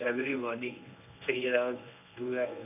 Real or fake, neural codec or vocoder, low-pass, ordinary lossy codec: fake; codec, 24 kHz, 0.9 kbps, WavTokenizer, medium speech release version 1; 3.6 kHz; none